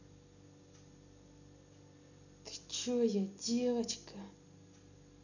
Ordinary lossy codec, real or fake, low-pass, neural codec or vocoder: none; real; 7.2 kHz; none